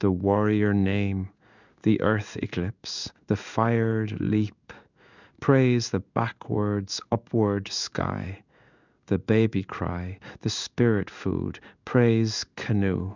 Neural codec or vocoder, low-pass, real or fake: codec, 16 kHz in and 24 kHz out, 1 kbps, XY-Tokenizer; 7.2 kHz; fake